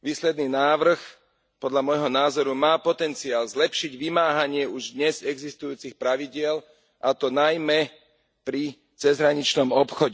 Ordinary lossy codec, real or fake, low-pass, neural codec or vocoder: none; real; none; none